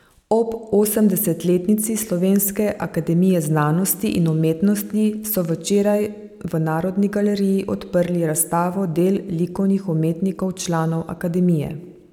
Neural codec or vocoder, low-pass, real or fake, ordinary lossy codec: none; 19.8 kHz; real; none